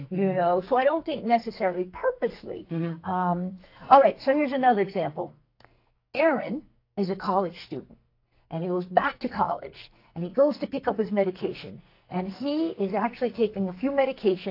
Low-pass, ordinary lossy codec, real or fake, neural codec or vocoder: 5.4 kHz; AAC, 32 kbps; fake; codec, 44.1 kHz, 2.6 kbps, SNAC